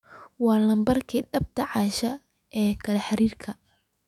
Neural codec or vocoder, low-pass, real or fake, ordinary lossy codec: autoencoder, 48 kHz, 128 numbers a frame, DAC-VAE, trained on Japanese speech; 19.8 kHz; fake; none